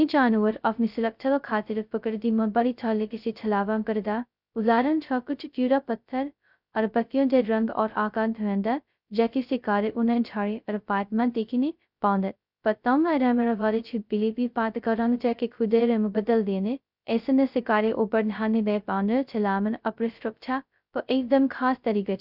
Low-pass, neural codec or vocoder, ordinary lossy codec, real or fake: 5.4 kHz; codec, 16 kHz, 0.2 kbps, FocalCodec; none; fake